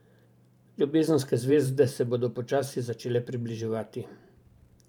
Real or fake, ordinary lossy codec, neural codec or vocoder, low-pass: fake; none; vocoder, 44.1 kHz, 128 mel bands every 512 samples, BigVGAN v2; 19.8 kHz